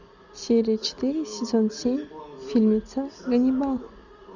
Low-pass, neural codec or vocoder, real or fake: 7.2 kHz; none; real